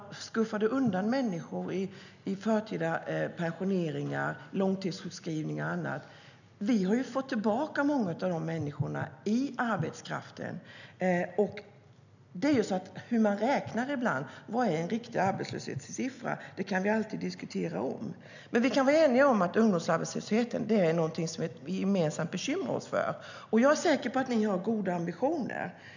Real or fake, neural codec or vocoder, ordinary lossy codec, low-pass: real; none; none; 7.2 kHz